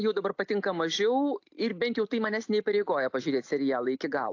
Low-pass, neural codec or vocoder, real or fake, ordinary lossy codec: 7.2 kHz; none; real; AAC, 48 kbps